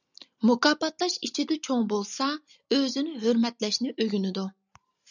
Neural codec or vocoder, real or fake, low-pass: none; real; 7.2 kHz